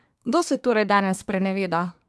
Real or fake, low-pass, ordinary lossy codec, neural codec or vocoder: fake; none; none; codec, 24 kHz, 1 kbps, SNAC